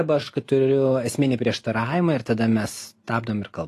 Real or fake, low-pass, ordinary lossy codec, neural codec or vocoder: real; 14.4 kHz; AAC, 48 kbps; none